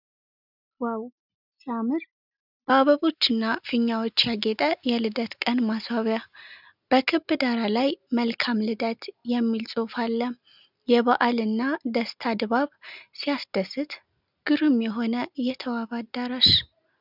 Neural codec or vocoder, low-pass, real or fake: none; 5.4 kHz; real